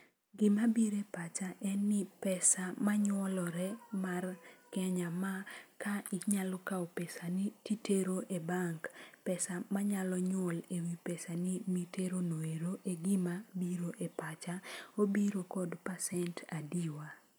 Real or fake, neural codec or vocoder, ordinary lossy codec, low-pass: real; none; none; none